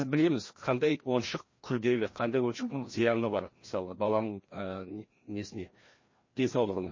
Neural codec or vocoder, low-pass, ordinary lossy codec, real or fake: codec, 16 kHz, 1 kbps, FreqCodec, larger model; 7.2 kHz; MP3, 32 kbps; fake